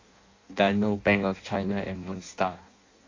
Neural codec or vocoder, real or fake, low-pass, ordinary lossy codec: codec, 16 kHz in and 24 kHz out, 0.6 kbps, FireRedTTS-2 codec; fake; 7.2 kHz; AAC, 32 kbps